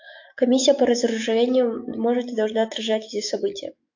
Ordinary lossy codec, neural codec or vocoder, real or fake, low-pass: AAC, 48 kbps; autoencoder, 48 kHz, 128 numbers a frame, DAC-VAE, trained on Japanese speech; fake; 7.2 kHz